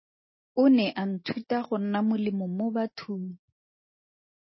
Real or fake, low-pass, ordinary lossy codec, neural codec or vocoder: real; 7.2 kHz; MP3, 24 kbps; none